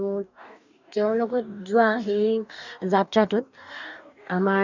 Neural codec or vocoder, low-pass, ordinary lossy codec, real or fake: codec, 44.1 kHz, 2.6 kbps, DAC; 7.2 kHz; none; fake